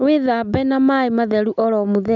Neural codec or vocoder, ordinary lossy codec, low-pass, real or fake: none; none; 7.2 kHz; real